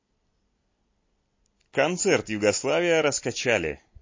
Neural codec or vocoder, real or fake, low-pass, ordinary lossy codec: none; real; 7.2 kHz; MP3, 32 kbps